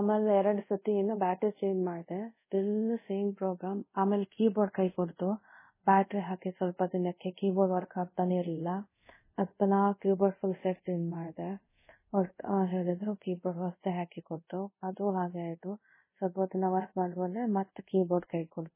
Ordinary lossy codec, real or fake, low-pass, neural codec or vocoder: MP3, 16 kbps; fake; 3.6 kHz; codec, 24 kHz, 0.5 kbps, DualCodec